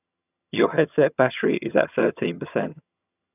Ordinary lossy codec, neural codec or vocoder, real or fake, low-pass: none; vocoder, 22.05 kHz, 80 mel bands, HiFi-GAN; fake; 3.6 kHz